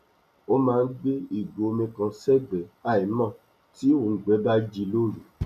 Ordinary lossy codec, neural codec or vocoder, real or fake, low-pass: none; none; real; 14.4 kHz